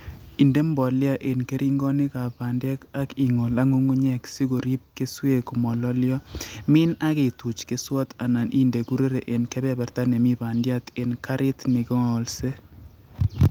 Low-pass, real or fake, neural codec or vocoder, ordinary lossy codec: 19.8 kHz; real; none; Opus, 32 kbps